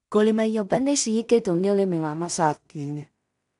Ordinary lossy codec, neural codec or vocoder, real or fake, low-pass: MP3, 96 kbps; codec, 16 kHz in and 24 kHz out, 0.4 kbps, LongCat-Audio-Codec, two codebook decoder; fake; 10.8 kHz